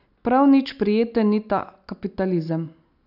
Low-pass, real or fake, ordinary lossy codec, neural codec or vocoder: 5.4 kHz; real; none; none